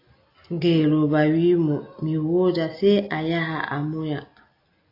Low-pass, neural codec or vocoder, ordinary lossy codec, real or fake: 5.4 kHz; none; AAC, 32 kbps; real